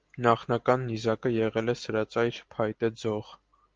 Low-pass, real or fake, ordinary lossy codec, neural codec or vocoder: 7.2 kHz; real; Opus, 32 kbps; none